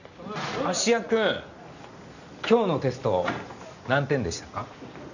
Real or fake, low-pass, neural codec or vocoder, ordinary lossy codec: real; 7.2 kHz; none; none